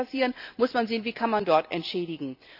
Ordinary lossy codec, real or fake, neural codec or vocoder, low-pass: AAC, 48 kbps; real; none; 5.4 kHz